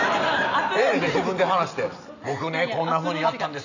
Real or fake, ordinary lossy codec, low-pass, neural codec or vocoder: real; none; 7.2 kHz; none